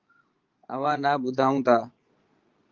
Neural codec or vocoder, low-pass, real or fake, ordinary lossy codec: vocoder, 22.05 kHz, 80 mel bands, Vocos; 7.2 kHz; fake; Opus, 24 kbps